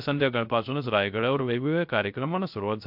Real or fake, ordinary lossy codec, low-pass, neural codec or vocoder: fake; MP3, 48 kbps; 5.4 kHz; codec, 16 kHz, 0.3 kbps, FocalCodec